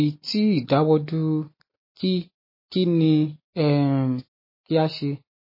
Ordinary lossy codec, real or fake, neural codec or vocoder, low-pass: MP3, 24 kbps; real; none; 5.4 kHz